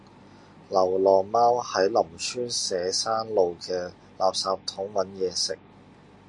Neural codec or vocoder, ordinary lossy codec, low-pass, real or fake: none; MP3, 48 kbps; 10.8 kHz; real